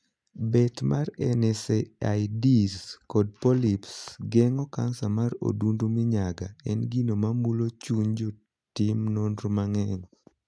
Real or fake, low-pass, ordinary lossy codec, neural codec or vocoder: real; none; none; none